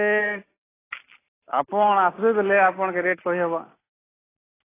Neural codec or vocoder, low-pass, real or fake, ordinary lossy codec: none; 3.6 kHz; real; AAC, 16 kbps